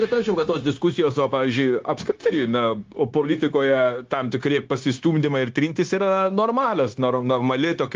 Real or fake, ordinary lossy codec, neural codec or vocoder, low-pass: fake; Opus, 32 kbps; codec, 16 kHz, 0.9 kbps, LongCat-Audio-Codec; 7.2 kHz